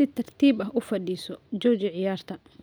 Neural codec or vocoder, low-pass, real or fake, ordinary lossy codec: none; none; real; none